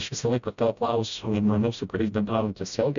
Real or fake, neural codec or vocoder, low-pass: fake; codec, 16 kHz, 0.5 kbps, FreqCodec, smaller model; 7.2 kHz